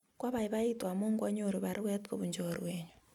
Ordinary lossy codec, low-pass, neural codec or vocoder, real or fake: none; 19.8 kHz; none; real